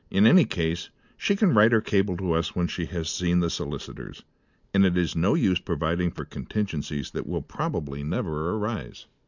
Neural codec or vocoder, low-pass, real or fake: none; 7.2 kHz; real